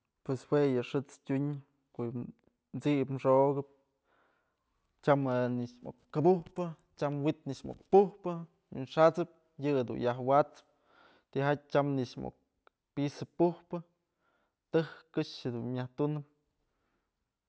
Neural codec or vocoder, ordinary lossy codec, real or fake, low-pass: none; none; real; none